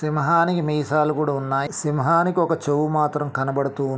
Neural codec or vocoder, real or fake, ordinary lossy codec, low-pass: none; real; none; none